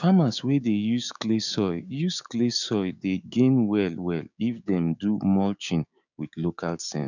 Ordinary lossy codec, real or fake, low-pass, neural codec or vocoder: none; fake; 7.2 kHz; codec, 16 kHz, 6 kbps, DAC